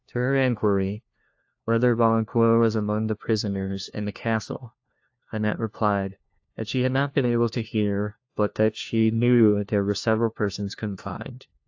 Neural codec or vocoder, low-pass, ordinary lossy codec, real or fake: codec, 16 kHz, 1 kbps, FunCodec, trained on LibriTTS, 50 frames a second; 7.2 kHz; AAC, 48 kbps; fake